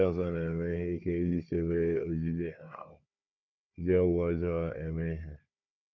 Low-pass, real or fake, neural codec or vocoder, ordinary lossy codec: 7.2 kHz; fake; codec, 16 kHz, 4 kbps, FunCodec, trained on Chinese and English, 50 frames a second; none